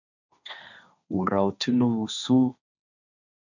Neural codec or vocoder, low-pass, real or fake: codec, 16 kHz, 1.1 kbps, Voila-Tokenizer; 7.2 kHz; fake